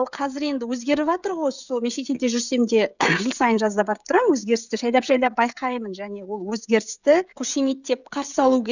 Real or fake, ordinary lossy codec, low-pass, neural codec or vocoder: fake; none; 7.2 kHz; codec, 16 kHz, 16 kbps, FreqCodec, smaller model